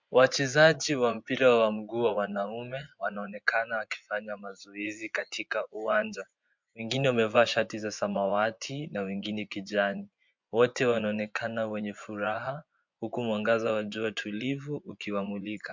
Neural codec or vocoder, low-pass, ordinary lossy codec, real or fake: vocoder, 24 kHz, 100 mel bands, Vocos; 7.2 kHz; MP3, 64 kbps; fake